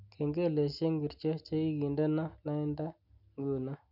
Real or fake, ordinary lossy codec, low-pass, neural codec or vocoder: real; none; 5.4 kHz; none